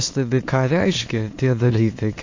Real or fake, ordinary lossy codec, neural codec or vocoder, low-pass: fake; AAC, 48 kbps; autoencoder, 22.05 kHz, a latent of 192 numbers a frame, VITS, trained on many speakers; 7.2 kHz